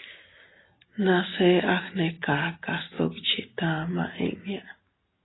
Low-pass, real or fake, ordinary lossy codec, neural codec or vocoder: 7.2 kHz; real; AAC, 16 kbps; none